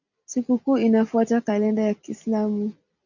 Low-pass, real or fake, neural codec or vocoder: 7.2 kHz; real; none